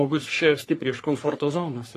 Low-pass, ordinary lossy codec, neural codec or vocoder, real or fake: 14.4 kHz; AAC, 48 kbps; codec, 44.1 kHz, 3.4 kbps, Pupu-Codec; fake